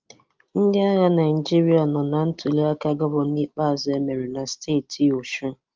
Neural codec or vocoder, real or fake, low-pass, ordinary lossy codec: none; real; 7.2 kHz; Opus, 32 kbps